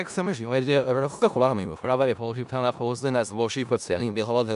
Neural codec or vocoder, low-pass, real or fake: codec, 16 kHz in and 24 kHz out, 0.4 kbps, LongCat-Audio-Codec, four codebook decoder; 10.8 kHz; fake